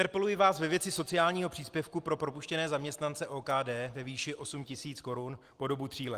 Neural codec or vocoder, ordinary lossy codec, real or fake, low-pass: none; Opus, 24 kbps; real; 14.4 kHz